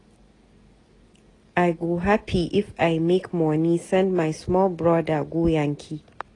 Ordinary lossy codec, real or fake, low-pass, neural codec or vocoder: AAC, 32 kbps; real; 10.8 kHz; none